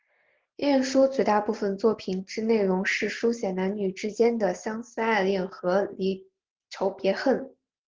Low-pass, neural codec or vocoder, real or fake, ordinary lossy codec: 7.2 kHz; none; real; Opus, 16 kbps